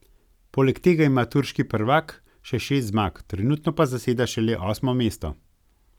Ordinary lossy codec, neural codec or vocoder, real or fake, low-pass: none; none; real; 19.8 kHz